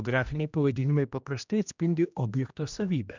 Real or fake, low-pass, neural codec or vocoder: fake; 7.2 kHz; codec, 16 kHz, 1 kbps, X-Codec, HuBERT features, trained on general audio